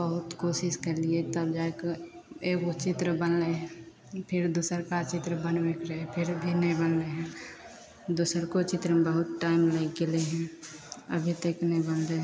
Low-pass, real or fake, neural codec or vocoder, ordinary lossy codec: none; real; none; none